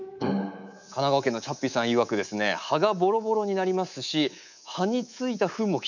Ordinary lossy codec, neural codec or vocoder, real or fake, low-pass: none; codec, 24 kHz, 3.1 kbps, DualCodec; fake; 7.2 kHz